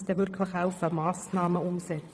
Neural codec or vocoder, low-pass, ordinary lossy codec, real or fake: vocoder, 22.05 kHz, 80 mel bands, WaveNeXt; none; none; fake